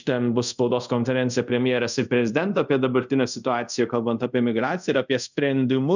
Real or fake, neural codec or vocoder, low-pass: fake; codec, 24 kHz, 0.5 kbps, DualCodec; 7.2 kHz